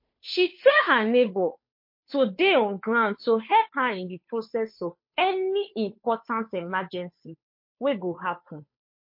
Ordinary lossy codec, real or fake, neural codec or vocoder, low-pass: MP3, 32 kbps; fake; codec, 16 kHz, 2 kbps, FunCodec, trained on Chinese and English, 25 frames a second; 5.4 kHz